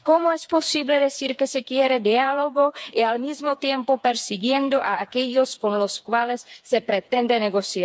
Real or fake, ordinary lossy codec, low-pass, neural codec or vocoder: fake; none; none; codec, 16 kHz, 4 kbps, FreqCodec, smaller model